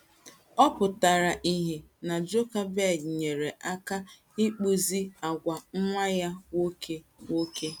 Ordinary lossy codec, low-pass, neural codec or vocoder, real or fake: none; none; none; real